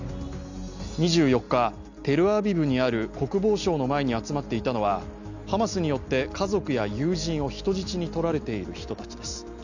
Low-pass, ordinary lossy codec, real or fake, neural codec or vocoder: 7.2 kHz; none; real; none